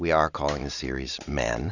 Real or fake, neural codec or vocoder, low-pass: real; none; 7.2 kHz